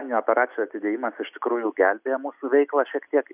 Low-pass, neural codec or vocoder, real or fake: 3.6 kHz; none; real